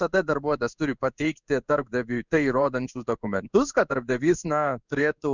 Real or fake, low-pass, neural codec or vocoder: fake; 7.2 kHz; codec, 16 kHz in and 24 kHz out, 1 kbps, XY-Tokenizer